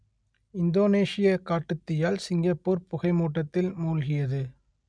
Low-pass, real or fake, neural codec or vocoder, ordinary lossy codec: 9.9 kHz; real; none; none